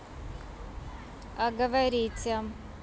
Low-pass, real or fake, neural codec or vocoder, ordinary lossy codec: none; real; none; none